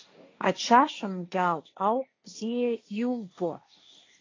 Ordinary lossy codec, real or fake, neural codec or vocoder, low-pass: AAC, 32 kbps; fake; codec, 16 kHz, 1.1 kbps, Voila-Tokenizer; 7.2 kHz